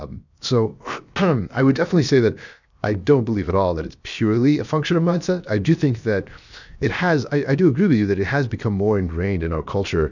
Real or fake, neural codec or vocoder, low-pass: fake; codec, 16 kHz, 0.7 kbps, FocalCodec; 7.2 kHz